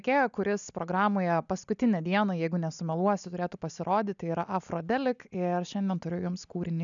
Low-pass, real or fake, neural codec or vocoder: 7.2 kHz; real; none